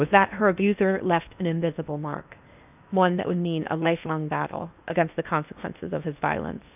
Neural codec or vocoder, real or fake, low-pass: codec, 16 kHz in and 24 kHz out, 0.8 kbps, FocalCodec, streaming, 65536 codes; fake; 3.6 kHz